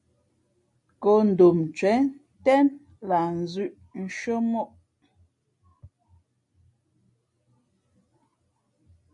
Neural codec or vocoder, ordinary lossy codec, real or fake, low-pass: none; MP3, 48 kbps; real; 10.8 kHz